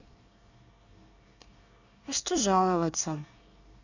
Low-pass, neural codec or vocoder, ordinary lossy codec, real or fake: 7.2 kHz; codec, 24 kHz, 1 kbps, SNAC; none; fake